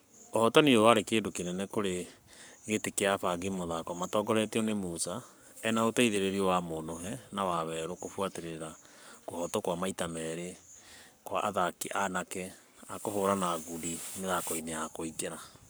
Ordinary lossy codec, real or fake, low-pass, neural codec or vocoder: none; fake; none; codec, 44.1 kHz, 7.8 kbps, Pupu-Codec